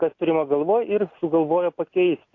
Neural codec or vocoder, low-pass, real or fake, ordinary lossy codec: none; 7.2 kHz; real; AAC, 48 kbps